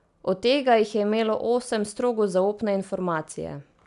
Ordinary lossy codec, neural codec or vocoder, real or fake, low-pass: none; none; real; 10.8 kHz